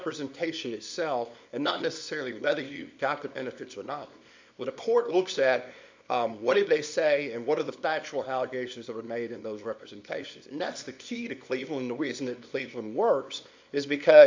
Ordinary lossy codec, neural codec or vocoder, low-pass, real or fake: MP3, 64 kbps; codec, 24 kHz, 0.9 kbps, WavTokenizer, small release; 7.2 kHz; fake